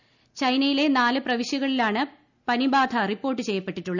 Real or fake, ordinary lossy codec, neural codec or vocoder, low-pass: real; none; none; 7.2 kHz